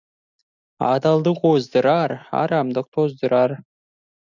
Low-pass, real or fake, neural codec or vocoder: 7.2 kHz; real; none